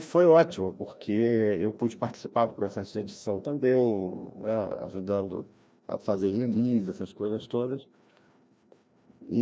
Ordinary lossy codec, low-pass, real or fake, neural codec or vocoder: none; none; fake; codec, 16 kHz, 1 kbps, FreqCodec, larger model